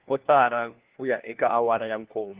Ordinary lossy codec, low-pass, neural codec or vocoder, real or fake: Opus, 16 kbps; 3.6 kHz; codec, 16 kHz, 1 kbps, FunCodec, trained on LibriTTS, 50 frames a second; fake